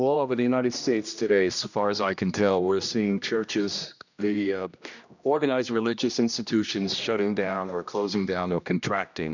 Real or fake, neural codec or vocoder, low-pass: fake; codec, 16 kHz, 1 kbps, X-Codec, HuBERT features, trained on general audio; 7.2 kHz